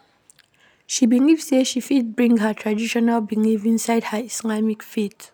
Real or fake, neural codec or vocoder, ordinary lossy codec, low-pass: real; none; none; none